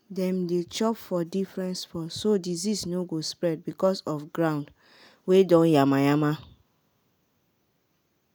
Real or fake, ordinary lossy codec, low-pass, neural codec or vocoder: real; none; none; none